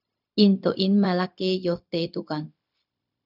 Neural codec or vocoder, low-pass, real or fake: codec, 16 kHz, 0.4 kbps, LongCat-Audio-Codec; 5.4 kHz; fake